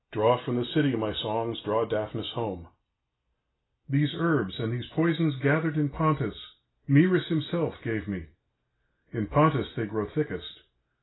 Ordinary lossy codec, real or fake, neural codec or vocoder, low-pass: AAC, 16 kbps; real; none; 7.2 kHz